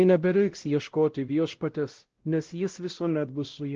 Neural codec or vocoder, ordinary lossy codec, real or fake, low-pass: codec, 16 kHz, 0.5 kbps, X-Codec, WavLM features, trained on Multilingual LibriSpeech; Opus, 16 kbps; fake; 7.2 kHz